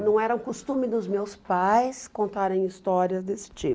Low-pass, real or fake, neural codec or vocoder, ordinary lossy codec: none; real; none; none